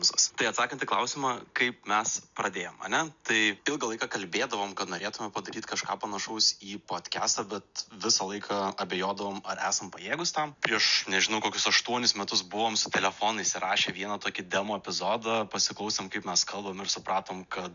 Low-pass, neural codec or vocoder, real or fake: 7.2 kHz; none; real